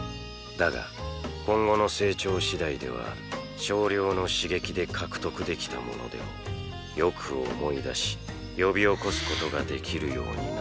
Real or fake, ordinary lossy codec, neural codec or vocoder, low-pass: real; none; none; none